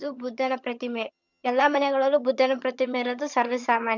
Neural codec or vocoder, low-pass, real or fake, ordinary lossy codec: vocoder, 22.05 kHz, 80 mel bands, HiFi-GAN; 7.2 kHz; fake; none